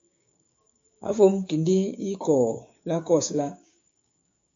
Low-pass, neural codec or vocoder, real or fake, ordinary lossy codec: 7.2 kHz; codec, 16 kHz, 6 kbps, DAC; fake; MP3, 48 kbps